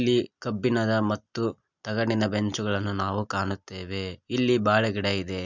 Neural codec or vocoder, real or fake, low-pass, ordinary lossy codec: none; real; 7.2 kHz; none